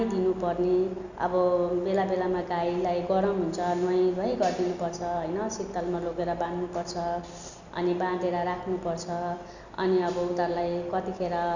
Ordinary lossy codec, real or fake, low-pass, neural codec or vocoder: none; real; 7.2 kHz; none